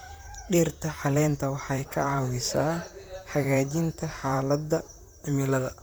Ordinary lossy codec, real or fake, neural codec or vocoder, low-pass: none; fake; vocoder, 44.1 kHz, 128 mel bands, Pupu-Vocoder; none